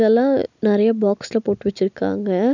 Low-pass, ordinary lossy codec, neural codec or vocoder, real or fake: 7.2 kHz; none; none; real